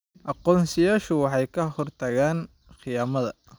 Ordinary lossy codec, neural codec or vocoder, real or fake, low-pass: none; none; real; none